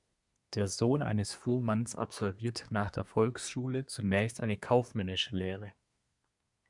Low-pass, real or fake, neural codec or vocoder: 10.8 kHz; fake; codec, 24 kHz, 1 kbps, SNAC